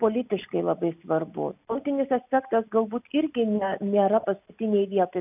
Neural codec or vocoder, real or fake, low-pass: none; real; 3.6 kHz